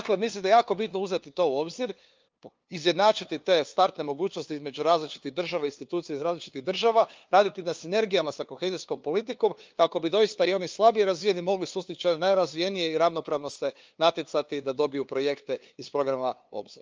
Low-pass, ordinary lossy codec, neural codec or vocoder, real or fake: 7.2 kHz; Opus, 24 kbps; codec, 16 kHz, 2 kbps, FunCodec, trained on LibriTTS, 25 frames a second; fake